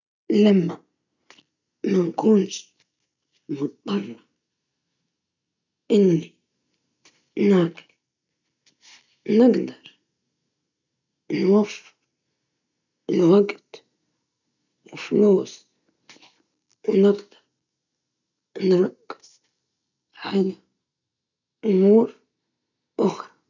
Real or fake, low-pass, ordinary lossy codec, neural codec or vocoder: real; 7.2 kHz; none; none